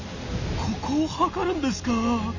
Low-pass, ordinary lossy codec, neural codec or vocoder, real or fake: 7.2 kHz; none; none; real